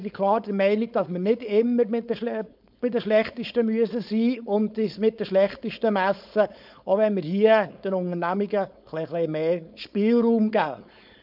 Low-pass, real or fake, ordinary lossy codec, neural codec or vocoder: 5.4 kHz; fake; none; codec, 16 kHz, 4.8 kbps, FACodec